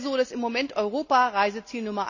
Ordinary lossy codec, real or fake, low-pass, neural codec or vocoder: none; real; 7.2 kHz; none